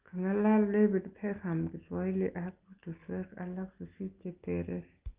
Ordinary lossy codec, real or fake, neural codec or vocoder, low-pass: Opus, 32 kbps; real; none; 3.6 kHz